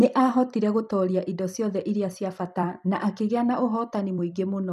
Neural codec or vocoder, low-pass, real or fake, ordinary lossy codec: vocoder, 44.1 kHz, 128 mel bands every 256 samples, BigVGAN v2; 14.4 kHz; fake; none